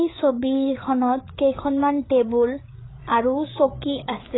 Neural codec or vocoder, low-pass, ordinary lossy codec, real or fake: codec, 16 kHz, 8 kbps, FreqCodec, larger model; 7.2 kHz; AAC, 16 kbps; fake